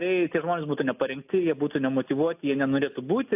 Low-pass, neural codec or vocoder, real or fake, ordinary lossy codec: 3.6 kHz; none; real; AAC, 32 kbps